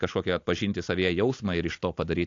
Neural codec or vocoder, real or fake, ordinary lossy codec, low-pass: none; real; AAC, 48 kbps; 7.2 kHz